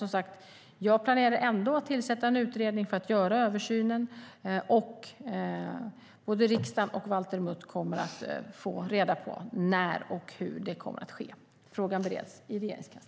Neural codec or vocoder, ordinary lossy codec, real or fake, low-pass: none; none; real; none